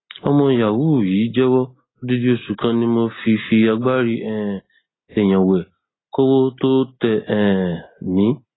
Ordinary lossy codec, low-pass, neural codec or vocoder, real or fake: AAC, 16 kbps; 7.2 kHz; none; real